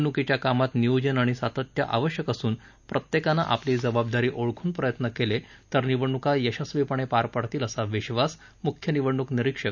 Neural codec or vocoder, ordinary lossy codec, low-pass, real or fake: none; none; 7.2 kHz; real